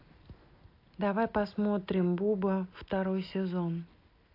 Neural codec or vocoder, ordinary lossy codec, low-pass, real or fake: none; AAC, 32 kbps; 5.4 kHz; real